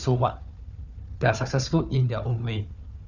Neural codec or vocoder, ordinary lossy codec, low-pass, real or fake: codec, 16 kHz, 4 kbps, FunCodec, trained on Chinese and English, 50 frames a second; none; 7.2 kHz; fake